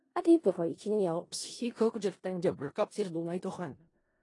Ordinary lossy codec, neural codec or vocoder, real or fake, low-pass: AAC, 32 kbps; codec, 16 kHz in and 24 kHz out, 0.4 kbps, LongCat-Audio-Codec, four codebook decoder; fake; 10.8 kHz